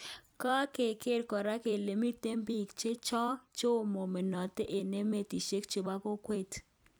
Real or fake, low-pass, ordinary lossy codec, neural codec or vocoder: fake; none; none; vocoder, 44.1 kHz, 128 mel bands every 256 samples, BigVGAN v2